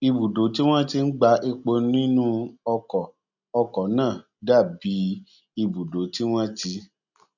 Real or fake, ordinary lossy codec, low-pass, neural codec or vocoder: real; none; 7.2 kHz; none